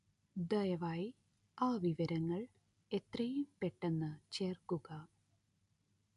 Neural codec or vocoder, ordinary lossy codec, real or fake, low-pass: none; none; real; 10.8 kHz